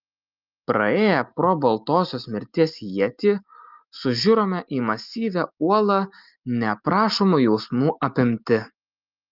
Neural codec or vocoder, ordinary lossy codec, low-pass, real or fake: none; Opus, 24 kbps; 5.4 kHz; real